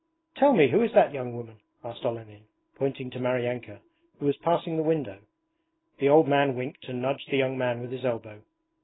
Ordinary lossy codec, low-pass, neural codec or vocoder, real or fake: AAC, 16 kbps; 7.2 kHz; none; real